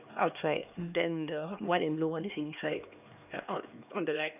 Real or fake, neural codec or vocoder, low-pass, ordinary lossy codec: fake; codec, 16 kHz, 2 kbps, X-Codec, HuBERT features, trained on LibriSpeech; 3.6 kHz; none